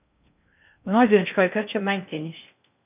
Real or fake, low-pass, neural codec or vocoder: fake; 3.6 kHz; codec, 16 kHz in and 24 kHz out, 0.6 kbps, FocalCodec, streaming, 2048 codes